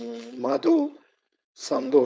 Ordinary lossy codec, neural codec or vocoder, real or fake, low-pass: none; codec, 16 kHz, 4.8 kbps, FACodec; fake; none